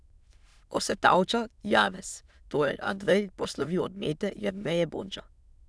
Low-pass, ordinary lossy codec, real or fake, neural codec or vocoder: none; none; fake; autoencoder, 22.05 kHz, a latent of 192 numbers a frame, VITS, trained on many speakers